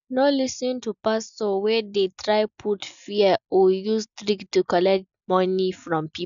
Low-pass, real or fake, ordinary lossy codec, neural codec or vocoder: 7.2 kHz; real; none; none